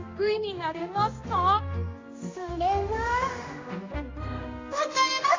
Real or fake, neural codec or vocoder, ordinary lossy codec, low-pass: fake; codec, 16 kHz, 1 kbps, X-Codec, HuBERT features, trained on general audio; AAC, 32 kbps; 7.2 kHz